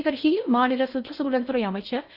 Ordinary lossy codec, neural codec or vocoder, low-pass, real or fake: none; codec, 16 kHz in and 24 kHz out, 0.6 kbps, FocalCodec, streaming, 4096 codes; 5.4 kHz; fake